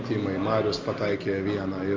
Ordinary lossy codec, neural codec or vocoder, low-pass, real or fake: Opus, 32 kbps; none; 7.2 kHz; real